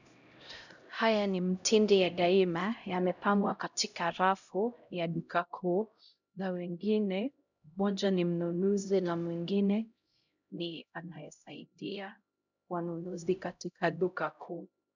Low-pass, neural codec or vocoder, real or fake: 7.2 kHz; codec, 16 kHz, 0.5 kbps, X-Codec, HuBERT features, trained on LibriSpeech; fake